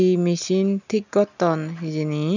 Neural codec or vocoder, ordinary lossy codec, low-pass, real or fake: none; none; 7.2 kHz; real